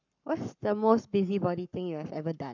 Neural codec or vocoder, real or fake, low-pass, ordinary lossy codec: codec, 44.1 kHz, 7.8 kbps, Pupu-Codec; fake; 7.2 kHz; none